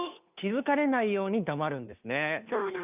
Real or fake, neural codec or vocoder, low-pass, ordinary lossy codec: fake; codec, 16 kHz, 2 kbps, FunCodec, trained on Chinese and English, 25 frames a second; 3.6 kHz; none